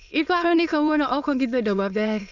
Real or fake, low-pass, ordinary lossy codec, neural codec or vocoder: fake; 7.2 kHz; none; autoencoder, 22.05 kHz, a latent of 192 numbers a frame, VITS, trained on many speakers